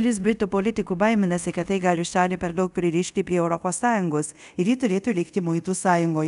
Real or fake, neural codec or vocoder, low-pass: fake; codec, 24 kHz, 0.5 kbps, DualCodec; 10.8 kHz